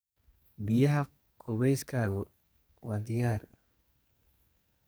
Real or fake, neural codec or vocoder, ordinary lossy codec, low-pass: fake; codec, 44.1 kHz, 2.6 kbps, SNAC; none; none